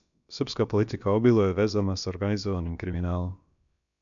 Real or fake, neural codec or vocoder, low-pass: fake; codec, 16 kHz, about 1 kbps, DyCAST, with the encoder's durations; 7.2 kHz